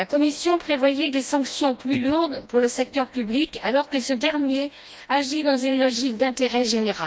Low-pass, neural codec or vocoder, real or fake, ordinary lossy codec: none; codec, 16 kHz, 1 kbps, FreqCodec, smaller model; fake; none